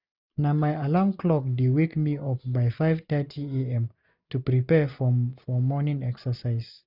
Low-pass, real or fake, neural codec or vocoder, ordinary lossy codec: 5.4 kHz; real; none; none